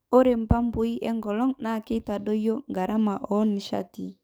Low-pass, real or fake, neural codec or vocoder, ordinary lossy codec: none; fake; codec, 44.1 kHz, 7.8 kbps, DAC; none